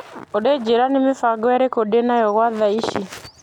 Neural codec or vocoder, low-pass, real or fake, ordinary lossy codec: none; 19.8 kHz; real; none